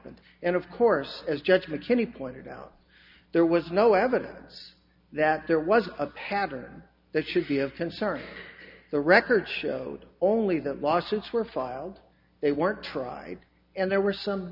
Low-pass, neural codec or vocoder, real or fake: 5.4 kHz; none; real